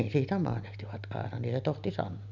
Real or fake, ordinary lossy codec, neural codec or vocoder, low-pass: real; none; none; 7.2 kHz